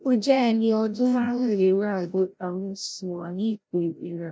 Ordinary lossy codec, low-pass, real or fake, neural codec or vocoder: none; none; fake; codec, 16 kHz, 0.5 kbps, FreqCodec, larger model